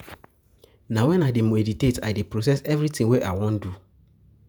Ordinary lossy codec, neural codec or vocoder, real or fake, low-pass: none; vocoder, 48 kHz, 128 mel bands, Vocos; fake; none